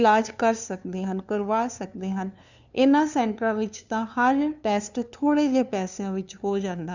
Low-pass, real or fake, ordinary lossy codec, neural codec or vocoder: 7.2 kHz; fake; none; codec, 16 kHz, 2 kbps, FunCodec, trained on LibriTTS, 25 frames a second